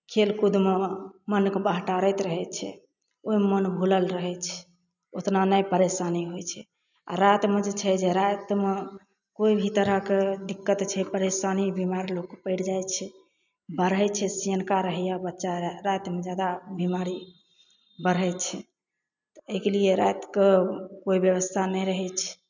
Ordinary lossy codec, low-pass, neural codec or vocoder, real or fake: none; 7.2 kHz; none; real